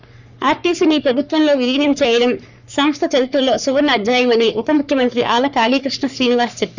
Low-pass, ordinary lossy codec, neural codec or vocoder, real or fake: 7.2 kHz; none; codec, 44.1 kHz, 3.4 kbps, Pupu-Codec; fake